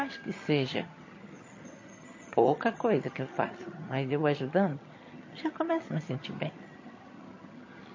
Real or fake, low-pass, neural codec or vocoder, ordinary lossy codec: fake; 7.2 kHz; vocoder, 22.05 kHz, 80 mel bands, HiFi-GAN; MP3, 32 kbps